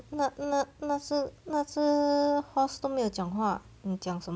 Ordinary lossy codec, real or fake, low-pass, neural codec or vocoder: none; real; none; none